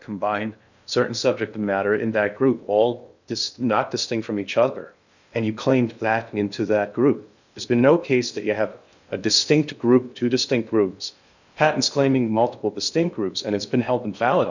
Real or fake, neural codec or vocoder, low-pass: fake; codec, 16 kHz in and 24 kHz out, 0.6 kbps, FocalCodec, streaming, 2048 codes; 7.2 kHz